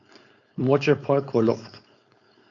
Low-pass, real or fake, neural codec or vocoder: 7.2 kHz; fake; codec, 16 kHz, 4.8 kbps, FACodec